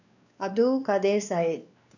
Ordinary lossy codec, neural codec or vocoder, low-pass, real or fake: none; codec, 16 kHz, 2 kbps, X-Codec, WavLM features, trained on Multilingual LibriSpeech; 7.2 kHz; fake